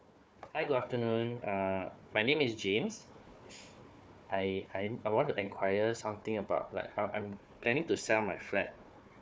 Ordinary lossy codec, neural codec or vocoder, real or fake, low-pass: none; codec, 16 kHz, 4 kbps, FunCodec, trained on Chinese and English, 50 frames a second; fake; none